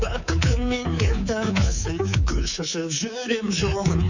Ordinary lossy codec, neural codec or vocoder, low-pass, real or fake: none; codec, 24 kHz, 3.1 kbps, DualCodec; 7.2 kHz; fake